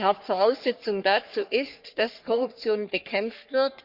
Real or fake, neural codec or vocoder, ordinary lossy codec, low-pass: fake; codec, 44.1 kHz, 3.4 kbps, Pupu-Codec; Opus, 64 kbps; 5.4 kHz